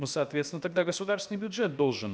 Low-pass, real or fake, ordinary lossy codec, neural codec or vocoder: none; fake; none; codec, 16 kHz, about 1 kbps, DyCAST, with the encoder's durations